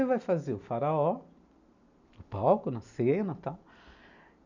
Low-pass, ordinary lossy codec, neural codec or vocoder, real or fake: 7.2 kHz; none; none; real